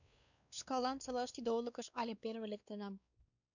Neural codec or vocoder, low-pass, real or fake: codec, 16 kHz, 2 kbps, X-Codec, WavLM features, trained on Multilingual LibriSpeech; 7.2 kHz; fake